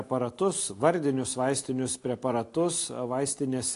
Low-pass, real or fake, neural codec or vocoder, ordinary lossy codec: 10.8 kHz; real; none; AAC, 48 kbps